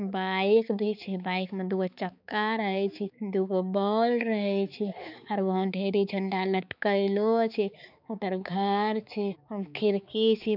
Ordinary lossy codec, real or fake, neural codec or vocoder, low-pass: none; fake; codec, 16 kHz, 4 kbps, X-Codec, HuBERT features, trained on balanced general audio; 5.4 kHz